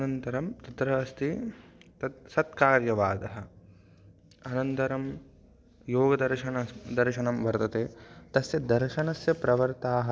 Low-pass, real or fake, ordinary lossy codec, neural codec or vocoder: none; real; none; none